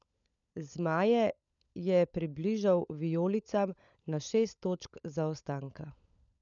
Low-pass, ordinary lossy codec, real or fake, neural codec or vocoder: 7.2 kHz; none; fake; codec, 16 kHz, 16 kbps, FunCodec, trained on LibriTTS, 50 frames a second